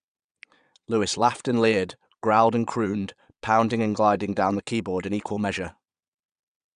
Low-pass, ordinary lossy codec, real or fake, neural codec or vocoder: 9.9 kHz; none; fake; vocoder, 22.05 kHz, 80 mel bands, Vocos